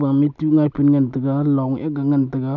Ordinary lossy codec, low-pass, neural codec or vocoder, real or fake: none; 7.2 kHz; none; real